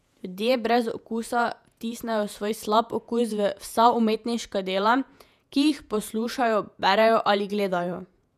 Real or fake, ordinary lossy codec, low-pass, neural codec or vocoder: fake; none; 14.4 kHz; vocoder, 48 kHz, 128 mel bands, Vocos